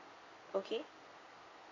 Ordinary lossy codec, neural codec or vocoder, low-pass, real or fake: AAC, 32 kbps; none; 7.2 kHz; real